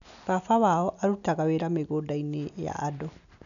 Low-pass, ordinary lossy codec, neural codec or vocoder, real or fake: 7.2 kHz; none; none; real